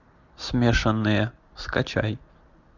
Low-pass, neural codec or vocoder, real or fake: 7.2 kHz; none; real